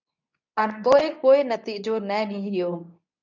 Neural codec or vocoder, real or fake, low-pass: codec, 24 kHz, 0.9 kbps, WavTokenizer, medium speech release version 2; fake; 7.2 kHz